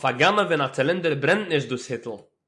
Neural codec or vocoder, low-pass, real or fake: none; 10.8 kHz; real